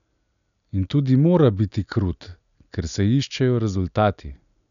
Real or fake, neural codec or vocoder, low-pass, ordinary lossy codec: real; none; 7.2 kHz; none